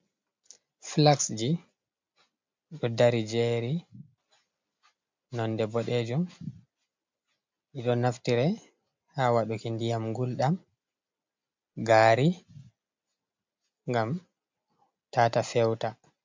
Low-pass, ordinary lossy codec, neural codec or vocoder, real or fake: 7.2 kHz; MP3, 64 kbps; none; real